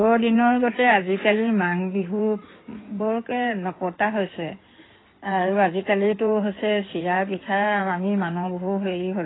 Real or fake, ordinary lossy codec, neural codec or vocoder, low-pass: fake; AAC, 16 kbps; codec, 16 kHz in and 24 kHz out, 2.2 kbps, FireRedTTS-2 codec; 7.2 kHz